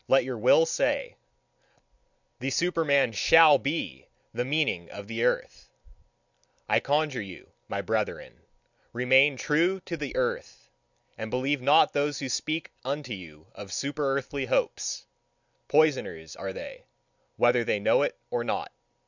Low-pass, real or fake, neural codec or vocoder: 7.2 kHz; real; none